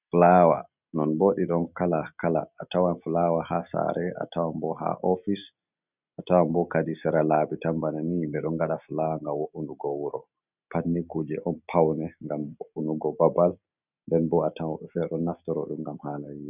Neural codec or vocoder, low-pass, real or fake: none; 3.6 kHz; real